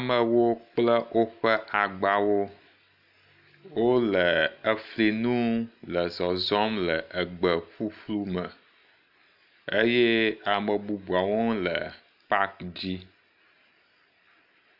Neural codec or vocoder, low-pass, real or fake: none; 5.4 kHz; real